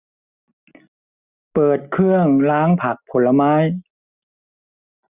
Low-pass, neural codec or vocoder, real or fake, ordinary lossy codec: 3.6 kHz; none; real; none